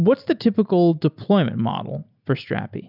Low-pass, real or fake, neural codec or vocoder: 5.4 kHz; real; none